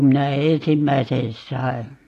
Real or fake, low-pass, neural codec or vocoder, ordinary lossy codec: real; 14.4 kHz; none; AAC, 48 kbps